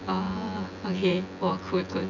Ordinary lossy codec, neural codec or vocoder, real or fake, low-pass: none; vocoder, 24 kHz, 100 mel bands, Vocos; fake; 7.2 kHz